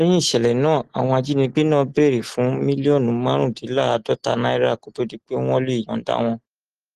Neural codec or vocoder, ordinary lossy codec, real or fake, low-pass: none; Opus, 16 kbps; real; 14.4 kHz